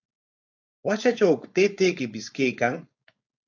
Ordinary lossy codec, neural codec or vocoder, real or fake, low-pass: AAC, 48 kbps; codec, 16 kHz, 4.8 kbps, FACodec; fake; 7.2 kHz